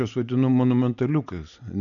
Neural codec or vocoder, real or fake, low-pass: none; real; 7.2 kHz